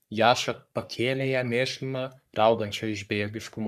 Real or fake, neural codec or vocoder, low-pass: fake; codec, 44.1 kHz, 3.4 kbps, Pupu-Codec; 14.4 kHz